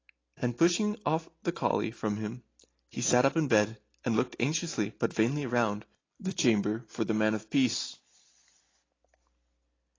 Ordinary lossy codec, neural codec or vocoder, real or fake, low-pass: AAC, 32 kbps; none; real; 7.2 kHz